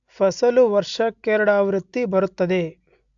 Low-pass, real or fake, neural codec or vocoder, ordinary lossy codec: 7.2 kHz; real; none; AAC, 64 kbps